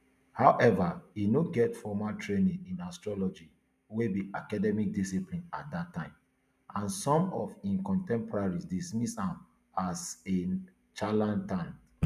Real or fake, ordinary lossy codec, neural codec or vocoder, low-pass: real; none; none; 14.4 kHz